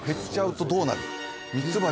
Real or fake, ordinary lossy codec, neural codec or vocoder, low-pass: real; none; none; none